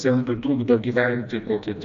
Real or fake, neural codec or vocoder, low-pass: fake; codec, 16 kHz, 1 kbps, FreqCodec, smaller model; 7.2 kHz